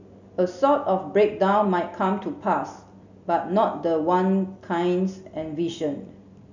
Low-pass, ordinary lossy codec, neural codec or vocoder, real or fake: 7.2 kHz; none; none; real